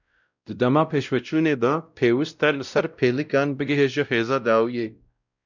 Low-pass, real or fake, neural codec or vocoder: 7.2 kHz; fake; codec, 16 kHz, 0.5 kbps, X-Codec, WavLM features, trained on Multilingual LibriSpeech